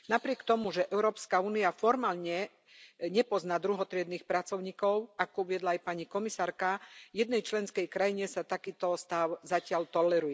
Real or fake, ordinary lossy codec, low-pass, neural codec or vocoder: real; none; none; none